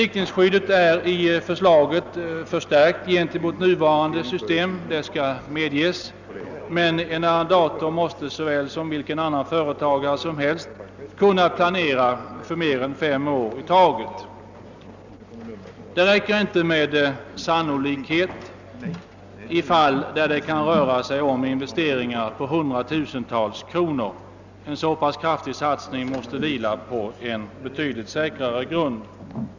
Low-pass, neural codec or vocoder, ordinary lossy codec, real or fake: 7.2 kHz; none; none; real